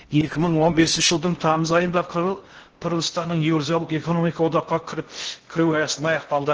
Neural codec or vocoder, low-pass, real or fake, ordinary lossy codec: codec, 16 kHz in and 24 kHz out, 0.6 kbps, FocalCodec, streaming, 4096 codes; 7.2 kHz; fake; Opus, 16 kbps